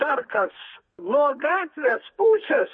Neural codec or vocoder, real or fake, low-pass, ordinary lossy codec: codec, 32 kHz, 1.9 kbps, SNAC; fake; 9.9 kHz; MP3, 32 kbps